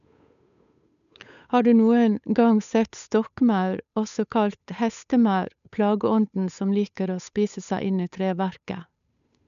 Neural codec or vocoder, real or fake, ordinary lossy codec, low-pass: codec, 16 kHz, 8 kbps, FunCodec, trained on Chinese and English, 25 frames a second; fake; none; 7.2 kHz